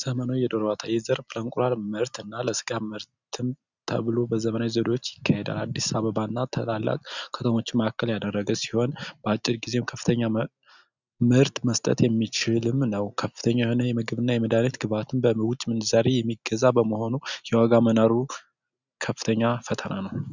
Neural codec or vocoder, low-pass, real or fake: none; 7.2 kHz; real